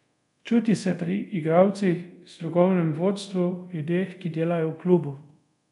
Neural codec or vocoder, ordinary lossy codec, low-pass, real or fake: codec, 24 kHz, 0.5 kbps, DualCodec; none; 10.8 kHz; fake